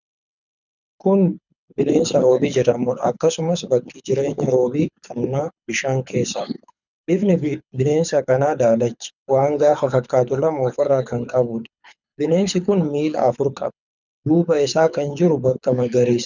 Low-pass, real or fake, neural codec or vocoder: 7.2 kHz; fake; codec, 24 kHz, 6 kbps, HILCodec